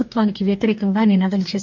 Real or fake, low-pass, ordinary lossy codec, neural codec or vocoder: fake; 7.2 kHz; MP3, 64 kbps; codec, 44.1 kHz, 2.6 kbps, DAC